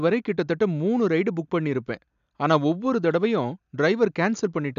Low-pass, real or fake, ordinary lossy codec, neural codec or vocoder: 7.2 kHz; real; none; none